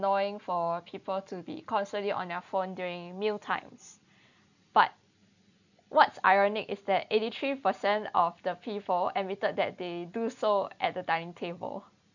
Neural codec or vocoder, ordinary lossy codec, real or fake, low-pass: none; none; real; 7.2 kHz